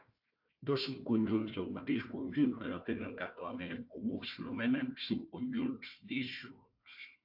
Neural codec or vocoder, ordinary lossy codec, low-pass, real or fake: codec, 16 kHz, 1 kbps, FunCodec, trained on Chinese and English, 50 frames a second; AAC, 48 kbps; 5.4 kHz; fake